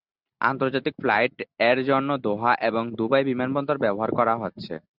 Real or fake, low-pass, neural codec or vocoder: real; 5.4 kHz; none